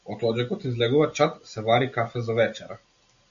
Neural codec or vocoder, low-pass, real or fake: none; 7.2 kHz; real